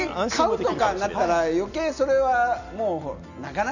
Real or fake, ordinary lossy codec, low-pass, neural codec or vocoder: real; none; 7.2 kHz; none